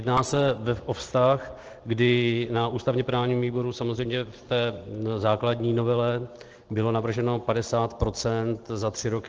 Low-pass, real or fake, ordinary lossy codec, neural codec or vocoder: 7.2 kHz; real; Opus, 16 kbps; none